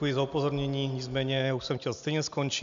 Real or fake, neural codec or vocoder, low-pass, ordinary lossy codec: real; none; 7.2 kHz; MP3, 64 kbps